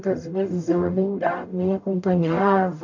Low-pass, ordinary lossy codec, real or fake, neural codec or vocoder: 7.2 kHz; none; fake; codec, 44.1 kHz, 0.9 kbps, DAC